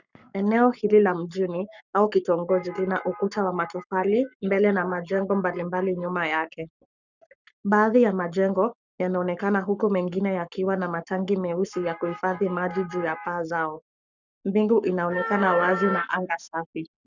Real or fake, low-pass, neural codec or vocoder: fake; 7.2 kHz; codec, 44.1 kHz, 7.8 kbps, Pupu-Codec